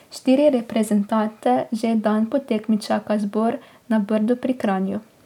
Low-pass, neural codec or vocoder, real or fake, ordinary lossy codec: 19.8 kHz; none; real; none